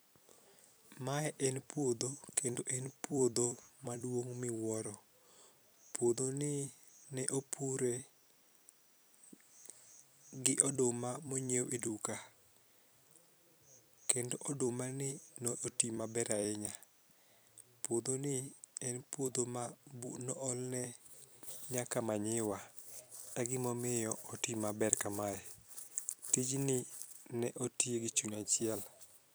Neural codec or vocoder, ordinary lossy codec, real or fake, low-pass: vocoder, 44.1 kHz, 128 mel bands every 256 samples, BigVGAN v2; none; fake; none